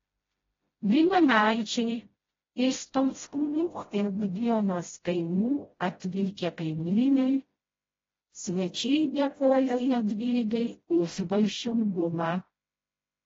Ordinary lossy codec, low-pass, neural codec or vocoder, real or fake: AAC, 24 kbps; 7.2 kHz; codec, 16 kHz, 0.5 kbps, FreqCodec, smaller model; fake